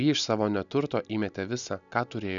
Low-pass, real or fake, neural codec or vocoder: 7.2 kHz; real; none